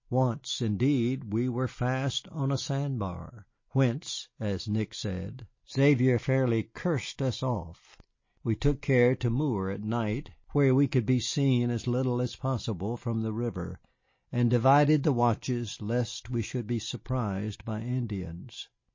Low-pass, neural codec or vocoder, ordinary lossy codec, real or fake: 7.2 kHz; none; MP3, 32 kbps; real